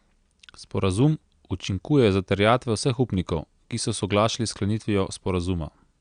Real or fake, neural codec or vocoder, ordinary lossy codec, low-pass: real; none; Opus, 64 kbps; 9.9 kHz